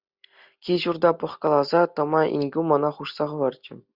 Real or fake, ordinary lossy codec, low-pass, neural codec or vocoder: real; Opus, 64 kbps; 5.4 kHz; none